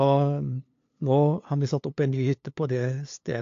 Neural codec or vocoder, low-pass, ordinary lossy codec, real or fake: codec, 16 kHz, 2 kbps, FunCodec, trained on LibriTTS, 25 frames a second; 7.2 kHz; AAC, 48 kbps; fake